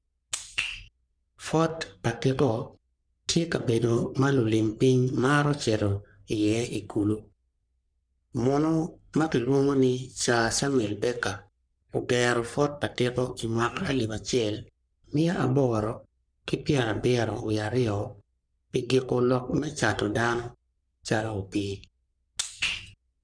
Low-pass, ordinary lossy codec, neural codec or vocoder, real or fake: 9.9 kHz; none; codec, 44.1 kHz, 3.4 kbps, Pupu-Codec; fake